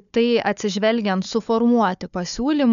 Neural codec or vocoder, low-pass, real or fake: codec, 16 kHz, 4 kbps, FunCodec, trained on Chinese and English, 50 frames a second; 7.2 kHz; fake